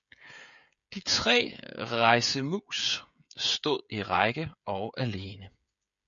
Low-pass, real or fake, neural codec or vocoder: 7.2 kHz; fake; codec, 16 kHz, 16 kbps, FreqCodec, smaller model